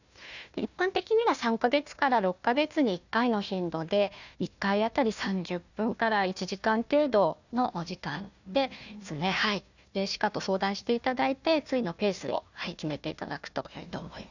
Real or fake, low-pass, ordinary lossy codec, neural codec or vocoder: fake; 7.2 kHz; none; codec, 16 kHz, 1 kbps, FunCodec, trained on Chinese and English, 50 frames a second